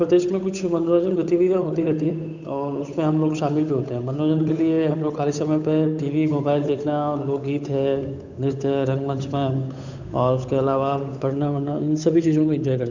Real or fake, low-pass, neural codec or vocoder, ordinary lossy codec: fake; 7.2 kHz; codec, 16 kHz, 8 kbps, FunCodec, trained on Chinese and English, 25 frames a second; none